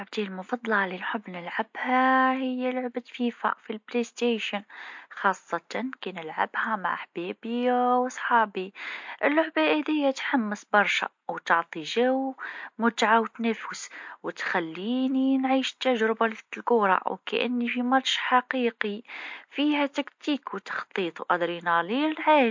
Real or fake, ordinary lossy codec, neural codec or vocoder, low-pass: real; MP3, 48 kbps; none; 7.2 kHz